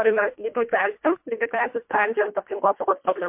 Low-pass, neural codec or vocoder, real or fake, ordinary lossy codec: 3.6 kHz; codec, 24 kHz, 1.5 kbps, HILCodec; fake; MP3, 32 kbps